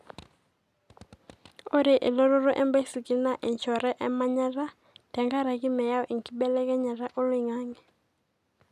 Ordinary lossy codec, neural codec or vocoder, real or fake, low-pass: none; none; real; 14.4 kHz